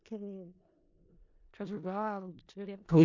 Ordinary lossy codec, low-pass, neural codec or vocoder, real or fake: MP3, 48 kbps; 7.2 kHz; codec, 16 kHz in and 24 kHz out, 0.4 kbps, LongCat-Audio-Codec, four codebook decoder; fake